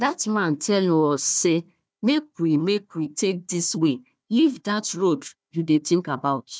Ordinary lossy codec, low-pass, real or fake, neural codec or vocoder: none; none; fake; codec, 16 kHz, 1 kbps, FunCodec, trained on Chinese and English, 50 frames a second